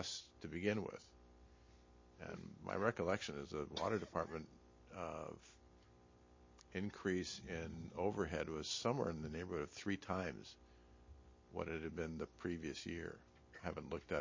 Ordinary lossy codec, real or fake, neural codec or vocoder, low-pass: MP3, 32 kbps; real; none; 7.2 kHz